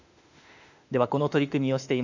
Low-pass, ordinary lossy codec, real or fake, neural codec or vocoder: 7.2 kHz; none; fake; autoencoder, 48 kHz, 32 numbers a frame, DAC-VAE, trained on Japanese speech